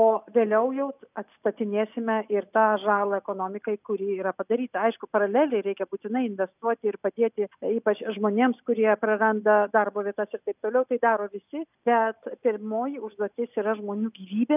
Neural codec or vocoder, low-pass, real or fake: none; 3.6 kHz; real